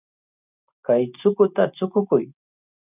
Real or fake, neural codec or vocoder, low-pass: real; none; 3.6 kHz